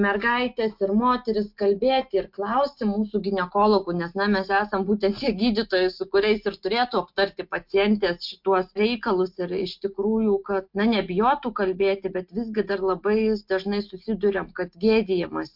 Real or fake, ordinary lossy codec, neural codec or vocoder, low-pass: real; MP3, 48 kbps; none; 5.4 kHz